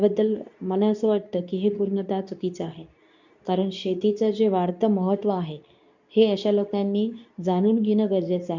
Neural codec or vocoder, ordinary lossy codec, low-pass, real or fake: codec, 24 kHz, 0.9 kbps, WavTokenizer, medium speech release version 2; none; 7.2 kHz; fake